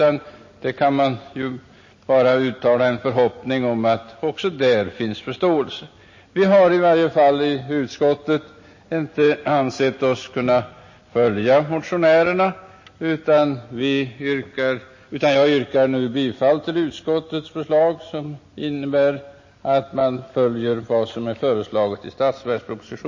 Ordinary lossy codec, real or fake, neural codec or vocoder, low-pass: MP3, 32 kbps; real; none; 7.2 kHz